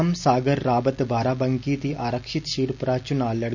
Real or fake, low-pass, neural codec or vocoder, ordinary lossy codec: real; 7.2 kHz; none; none